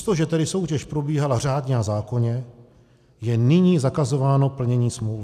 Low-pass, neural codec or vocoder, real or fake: 14.4 kHz; none; real